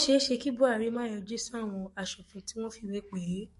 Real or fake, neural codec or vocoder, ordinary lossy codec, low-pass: fake; codec, 44.1 kHz, 7.8 kbps, DAC; MP3, 48 kbps; 14.4 kHz